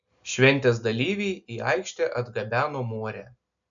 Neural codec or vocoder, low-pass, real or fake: none; 7.2 kHz; real